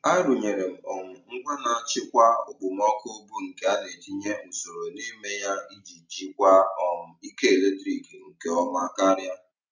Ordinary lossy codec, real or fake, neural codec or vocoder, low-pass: none; real; none; 7.2 kHz